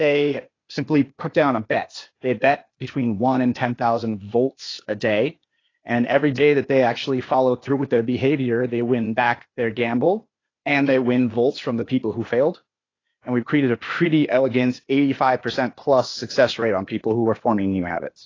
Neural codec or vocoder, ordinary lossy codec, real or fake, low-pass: codec, 16 kHz, 0.8 kbps, ZipCodec; AAC, 32 kbps; fake; 7.2 kHz